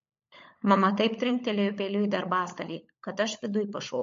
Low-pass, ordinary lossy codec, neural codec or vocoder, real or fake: 7.2 kHz; MP3, 48 kbps; codec, 16 kHz, 16 kbps, FunCodec, trained on LibriTTS, 50 frames a second; fake